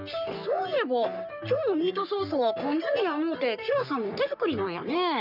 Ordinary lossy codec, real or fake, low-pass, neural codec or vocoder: none; fake; 5.4 kHz; codec, 44.1 kHz, 3.4 kbps, Pupu-Codec